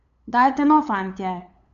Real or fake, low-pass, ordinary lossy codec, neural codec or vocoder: fake; 7.2 kHz; none; codec, 16 kHz, 8 kbps, FunCodec, trained on LibriTTS, 25 frames a second